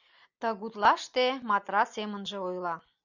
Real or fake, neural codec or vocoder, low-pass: real; none; 7.2 kHz